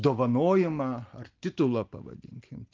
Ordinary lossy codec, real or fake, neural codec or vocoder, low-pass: Opus, 32 kbps; real; none; 7.2 kHz